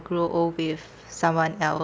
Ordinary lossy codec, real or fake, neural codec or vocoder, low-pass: none; real; none; none